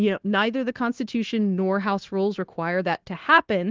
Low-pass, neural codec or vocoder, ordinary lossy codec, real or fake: 7.2 kHz; codec, 16 kHz, 0.9 kbps, LongCat-Audio-Codec; Opus, 16 kbps; fake